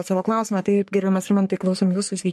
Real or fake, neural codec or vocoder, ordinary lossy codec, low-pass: fake; codec, 44.1 kHz, 3.4 kbps, Pupu-Codec; MP3, 64 kbps; 14.4 kHz